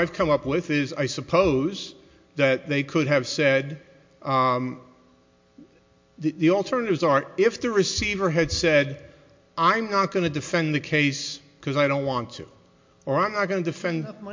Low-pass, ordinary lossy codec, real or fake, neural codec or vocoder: 7.2 kHz; MP3, 48 kbps; real; none